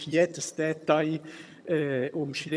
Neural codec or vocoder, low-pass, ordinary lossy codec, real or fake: vocoder, 22.05 kHz, 80 mel bands, HiFi-GAN; none; none; fake